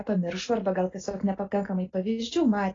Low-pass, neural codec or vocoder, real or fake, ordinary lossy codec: 7.2 kHz; none; real; AAC, 32 kbps